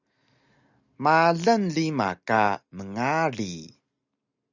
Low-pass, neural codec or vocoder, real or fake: 7.2 kHz; none; real